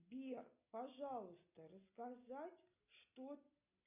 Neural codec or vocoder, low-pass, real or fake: none; 3.6 kHz; real